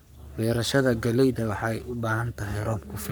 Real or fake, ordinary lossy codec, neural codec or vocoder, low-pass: fake; none; codec, 44.1 kHz, 3.4 kbps, Pupu-Codec; none